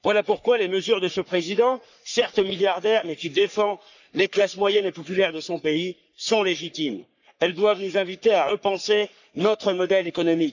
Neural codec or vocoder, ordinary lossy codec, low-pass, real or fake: codec, 44.1 kHz, 3.4 kbps, Pupu-Codec; none; 7.2 kHz; fake